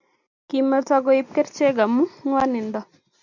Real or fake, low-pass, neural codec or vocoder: real; 7.2 kHz; none